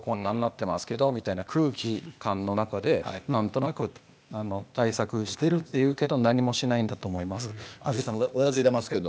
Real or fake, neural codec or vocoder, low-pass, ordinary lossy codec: fake; codec, 16 kHz, 0.8 kbps, ZipCodec; none; none